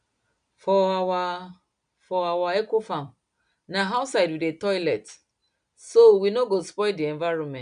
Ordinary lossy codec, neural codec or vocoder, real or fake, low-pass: none; none; real; 9.9 kHz